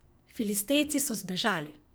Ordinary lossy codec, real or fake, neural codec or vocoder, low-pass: none; fake; codec, 44.1 kHz, 2.6 kbps, SNAC; none